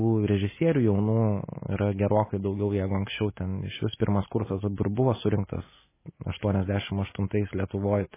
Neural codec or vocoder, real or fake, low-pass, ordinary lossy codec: none; real; 3.6 kHz; MP3, 16 kbps